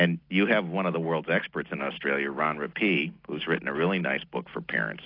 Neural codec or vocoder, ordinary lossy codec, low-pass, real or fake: none; AAC, 32 kbps; 5.4 kHz; real